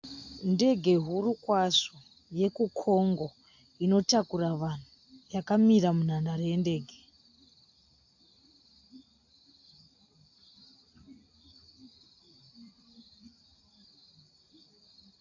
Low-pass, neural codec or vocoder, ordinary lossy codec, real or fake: 7.2 kHz; none; AAC, 48 kbps; real